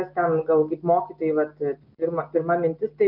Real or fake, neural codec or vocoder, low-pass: real; none; 5.4 kHz